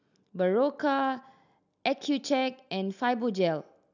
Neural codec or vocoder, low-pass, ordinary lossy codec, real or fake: none; 7.2 kHz; none; real